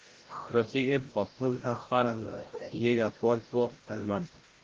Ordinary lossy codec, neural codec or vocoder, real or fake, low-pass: Opus, 16 kbps; codec, 16 kHz, 0.5 kbps, FreqCodec, larger model; fake; 7.2 kHz